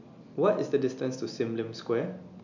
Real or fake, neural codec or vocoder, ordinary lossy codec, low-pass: real; none; none; 7.2 kHz